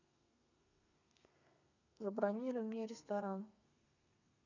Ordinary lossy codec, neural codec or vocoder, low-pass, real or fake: none; codec, 32 kHz, 1.9 kbps, SNAC; 7.2 kHz; fake